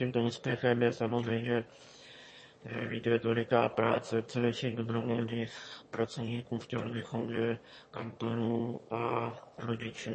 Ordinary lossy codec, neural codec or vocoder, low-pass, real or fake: MP3, 32 kbps; autoencoder, 22.05 kHz, a latent of 192 numbers a frame, VITS, trained on one speaker; 9.9 kHz; fake